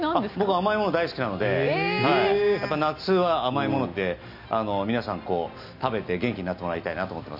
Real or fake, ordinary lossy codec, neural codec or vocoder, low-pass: real; none; none; 5.4 kHz